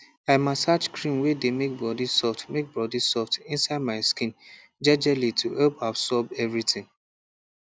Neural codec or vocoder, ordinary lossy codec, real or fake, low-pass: none; none; real; none